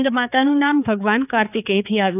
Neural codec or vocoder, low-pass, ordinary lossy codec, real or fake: codec, 16 kHz, 4 kbps, X-Codec, HuBERT features, trained on balanced general audio; 3.6 kHz; none; fake